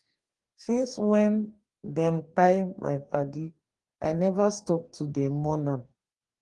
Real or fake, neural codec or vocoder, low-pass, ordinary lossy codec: fake; codec, 44.1 kHz, 2.6 kbps, DAC; 10.8 kHz; Opus, 24 kbps